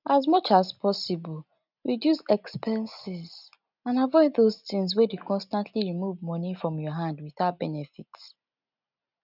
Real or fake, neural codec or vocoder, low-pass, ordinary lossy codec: real; none; 5.4 kHz; none